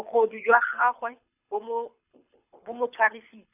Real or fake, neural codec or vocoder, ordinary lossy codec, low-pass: fake; codec, 24 kHz, 6 kbps, HILCodec; none; 3.6 kHz